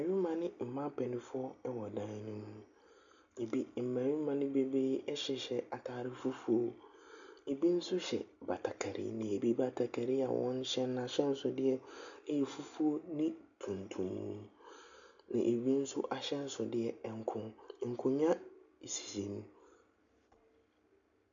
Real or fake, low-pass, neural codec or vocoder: real; 7.2 kHz; none